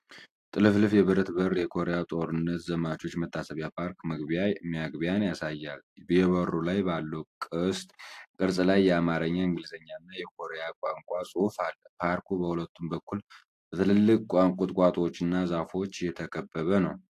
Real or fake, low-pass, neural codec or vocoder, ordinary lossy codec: real; 14.4 kHz; none; AAC, 64 kbps